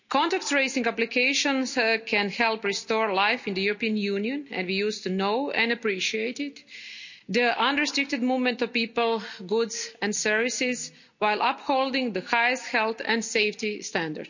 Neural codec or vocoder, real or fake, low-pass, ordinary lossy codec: none; real; 7.2 kHz; none